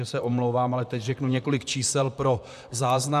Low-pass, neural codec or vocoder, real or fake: 14.4 kHz; vocoder, 44.1 kHz, 128 mel bands, Pupu-Vocoder; fake